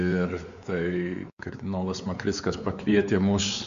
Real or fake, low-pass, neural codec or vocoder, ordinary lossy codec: fake; 7.2 kHz; codec, 16 kHz, 4 kbps, X-Codec, WavLM features, trained on Multilingual LibriSpeech; Opus, 64 kbps